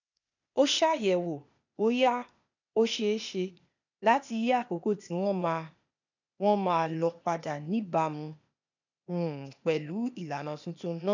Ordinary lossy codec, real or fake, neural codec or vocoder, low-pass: none; fake; codec, 16 kHz, 0.8 kbps, ZipCodec; 7.2 kHz